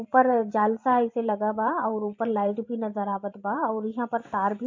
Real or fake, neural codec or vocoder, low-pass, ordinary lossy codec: fake; vocoder, 44.1 kHz, 128 mel bands every 512 samples, BigVGAN v2; 7.2 kHz; AAC, 48 kbps